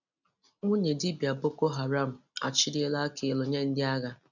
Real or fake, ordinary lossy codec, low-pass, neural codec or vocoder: real; none; 7.2 kHz; none